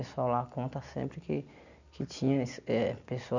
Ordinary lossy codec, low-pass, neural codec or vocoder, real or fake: none; 7.2 kHz; none; real